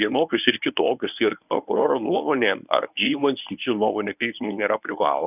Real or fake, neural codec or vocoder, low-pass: fake; codec, 24 kHz, 0.9 kbps, WavTokenizer, medium speech release version 1; 3.6 kHz